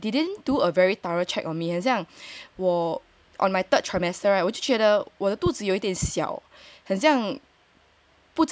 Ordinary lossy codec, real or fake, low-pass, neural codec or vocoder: none; real; none; none